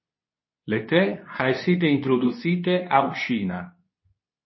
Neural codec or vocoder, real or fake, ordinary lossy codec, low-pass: codec, 24 kHz, 0.9 kbps, WavTokenizer, medium speech release version 2; fake; MP3, 24 kbps; 7.2 kHz